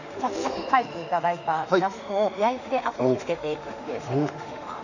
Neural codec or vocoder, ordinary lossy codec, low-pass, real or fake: autoencoder, 48 kHz, 32 numbers a frame, DAC-VAE, trained on Japanese speech; none; 7.2 kHz; fake